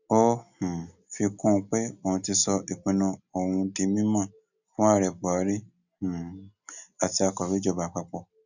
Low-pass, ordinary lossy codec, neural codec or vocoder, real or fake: 7.2 kHz; none; none; real